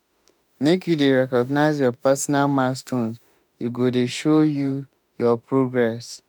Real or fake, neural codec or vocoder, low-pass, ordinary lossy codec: fake; autoencoder, 48 kHz, 32 numbers a frame, DAC-VAE, trained on Japanese speech; none; none